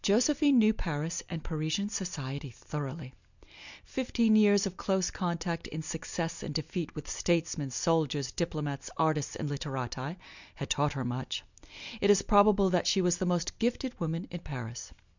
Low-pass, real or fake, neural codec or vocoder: 7.2 kHz; real; none